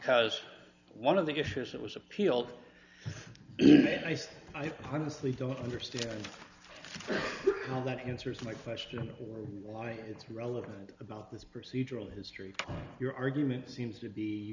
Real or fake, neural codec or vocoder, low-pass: real; none; 7.2 kHz